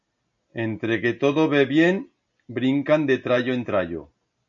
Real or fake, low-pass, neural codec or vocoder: real; 7.2 kHz; none